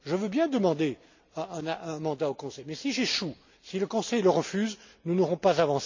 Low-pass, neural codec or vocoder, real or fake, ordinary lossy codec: 7.2 kHz; none; real; MP3, 64 kbps